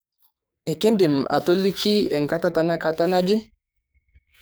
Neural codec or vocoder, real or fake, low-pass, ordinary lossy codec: codec, 44.1 kHz, 2.6 kbps, SNAC; fake; none; none